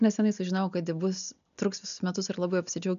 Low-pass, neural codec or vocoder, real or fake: 7.2 kHz; none; real